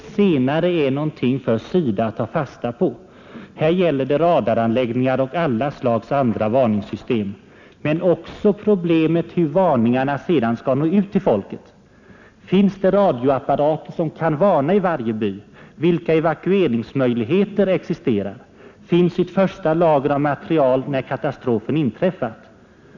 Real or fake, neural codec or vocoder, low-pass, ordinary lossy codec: real; none; 7.2 kHz; none